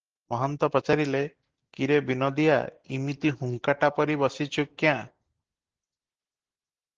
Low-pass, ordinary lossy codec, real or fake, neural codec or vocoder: 7.2 kHz; Opus, 16 kbps; real; none